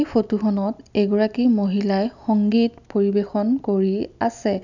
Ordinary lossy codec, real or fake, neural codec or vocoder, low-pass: none; real; none; 7.2 kHz